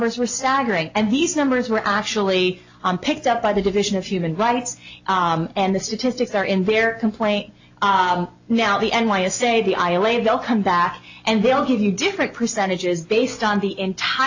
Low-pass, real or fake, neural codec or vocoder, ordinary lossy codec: 7.2 kHz; real; none; AAC, 48 kbps